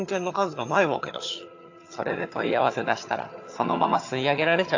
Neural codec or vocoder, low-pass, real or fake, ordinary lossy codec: vocoder, 22.05 kHz, 80 mel bands, HiFi-GAN; 7.2 kHz; fake; AAC, 48 kbps